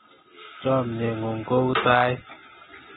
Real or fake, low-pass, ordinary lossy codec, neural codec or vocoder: real; 19.8 kHz; AAC, 16 kbps; none